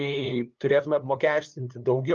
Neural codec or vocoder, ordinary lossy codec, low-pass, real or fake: codec, 16 kHz, 2 kbps, FunCodec, trained on LibriTTS, 25 frames a second; Opus, 24 kbps; 7.2 kHz; fake